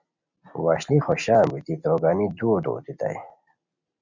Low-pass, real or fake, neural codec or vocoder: 7.2 kHz; real; none